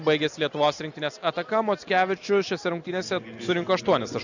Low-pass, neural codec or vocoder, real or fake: 7.2 kHz; none; real